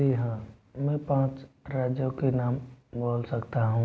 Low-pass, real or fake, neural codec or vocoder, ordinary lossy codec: none; real; none; none